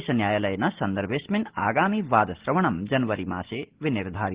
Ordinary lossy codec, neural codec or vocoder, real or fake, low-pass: Opus, 16 kbps; none; real; 3.6 kHz